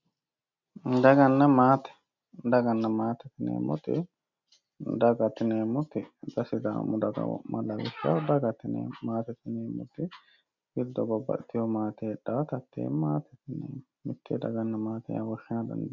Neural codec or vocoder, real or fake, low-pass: none; real; 7.2 kHz